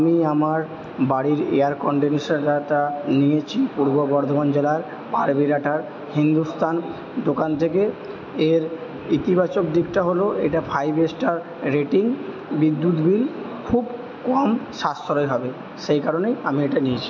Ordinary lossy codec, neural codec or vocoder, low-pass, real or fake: MP3, 48 kbps; none; 7.2 kHz; real